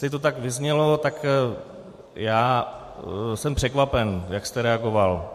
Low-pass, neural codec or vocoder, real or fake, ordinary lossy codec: 14.4 kHz; vocoder, 44.1 kHz, 128 mel bands every 512 samples, BigVGAN v2; fake; MP3, 64 kbps